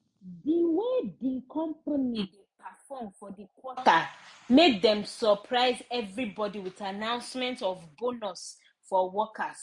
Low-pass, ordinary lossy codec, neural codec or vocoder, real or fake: 10.8 kHz; MP3, 48 kbps; none; real